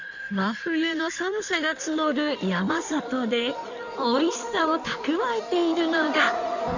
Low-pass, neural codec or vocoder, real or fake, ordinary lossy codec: 7.2 kHz; codec, 16 kHz in and 24 kHz out, 1.1 kbps, FireRedTTS-2 codec; fake; none